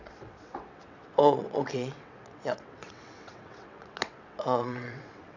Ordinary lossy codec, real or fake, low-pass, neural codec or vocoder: none; fake; 7.2 kHz; vocoder, 22.05 kHz, 80 mel bands, WaveNeXt